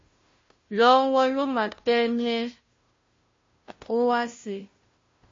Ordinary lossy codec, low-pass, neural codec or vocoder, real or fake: MP3, 32 kbps; 7.2 kHz; codec, 16 kHz, 0.5 kbps, FunCodec, trained on Chinese and English, 25 frames a second; fake